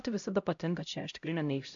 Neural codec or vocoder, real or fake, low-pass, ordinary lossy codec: codec, 16 kHz, 0.5 kbps, X-Codec, HuBERT features, trained on LibriSpeech; fake; 7.2 kHz; AAC, 64 kbps